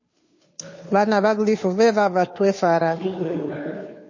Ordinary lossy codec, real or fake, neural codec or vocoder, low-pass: MP3, 32 kbps; fake; codec, 16 kHz, 2 kbps, FunCodec, trained on Chinese and English, 25 frames a second; 7.2 kHz